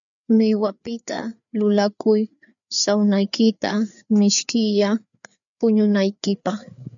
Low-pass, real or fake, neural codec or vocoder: 7.2 kHz; fake; codec, 16 kHz, 4 kbps, FreqCodec, larger model